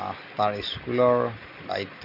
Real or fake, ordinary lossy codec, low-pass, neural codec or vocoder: real; none; 5.4 kHz; none